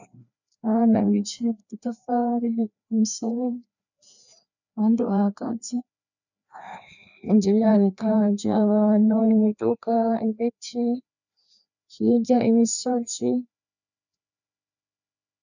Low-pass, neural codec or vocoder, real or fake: 7.2 kHz; codec, 16 kHz, 2 kbps, FreqCodec, larger model; fake